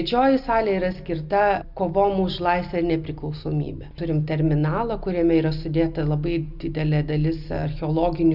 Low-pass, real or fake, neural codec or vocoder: 5.4 kHz; real; none